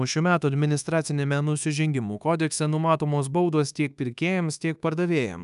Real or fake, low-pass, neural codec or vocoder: fake; 10.8 kHz; codec, 24 kHz, 1.2 kbps, DualCodec